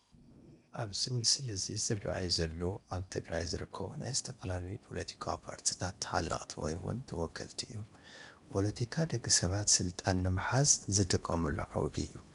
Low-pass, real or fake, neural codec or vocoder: 10.8 kHz; fake; codec, 16 kHz in and 24 kHz out, 0.8 kbps, FocalCodec, streaming, 65536 codes